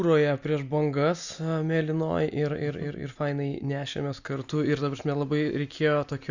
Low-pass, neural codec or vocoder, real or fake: 7.2 kHz; none; real